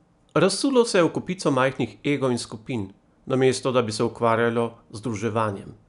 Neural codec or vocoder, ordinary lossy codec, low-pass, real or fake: none; none; 10.8 kHz; real